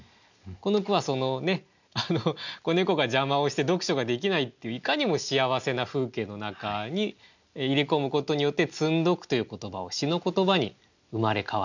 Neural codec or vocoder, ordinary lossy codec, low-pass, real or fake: none; none; 7.2 kHz; real